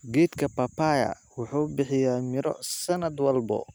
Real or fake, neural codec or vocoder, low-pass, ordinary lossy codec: real; none; none; none